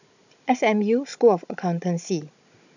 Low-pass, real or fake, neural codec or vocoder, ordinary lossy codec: 7.2 kHz; fake; codec, 16 kHz, 16 kbps, FunCodec, trained on Chinese and English, 50 frames a second; none